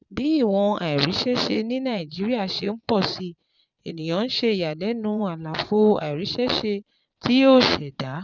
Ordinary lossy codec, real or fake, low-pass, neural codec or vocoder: none; fake; 7.2 kHz; vocoder, 22.05 kHz, 80 mel bands, Vocos